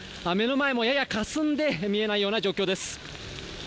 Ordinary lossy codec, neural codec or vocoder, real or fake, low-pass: none; none; real; none